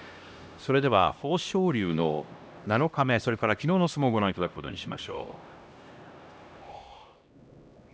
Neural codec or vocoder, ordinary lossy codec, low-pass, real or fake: codec, 16 kHz, 1 kbps, X-Codec, HuBERT features, trained on LibriSpeech; none; none; fake